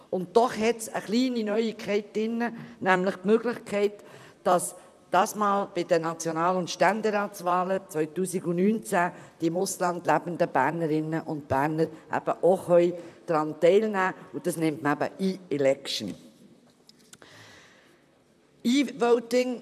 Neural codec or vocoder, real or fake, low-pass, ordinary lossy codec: vocoder, 44.1 kHz, 128 mel bands, Pupu-Vocoder; fake; 14.4 kHz; none